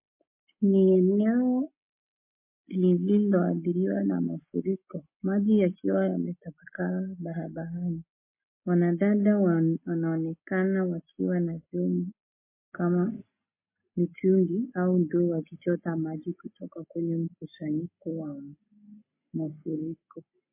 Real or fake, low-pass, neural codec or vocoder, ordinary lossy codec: real; 3.6 kHz; none; MP3, 24 kbps